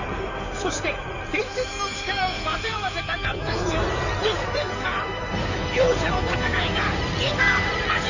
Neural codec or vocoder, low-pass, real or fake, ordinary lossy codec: codec, 16 kHz in and 24 kHz out, 2.2 kbps, FireRedTTS-2 codec; 7.2 kHz; fake; none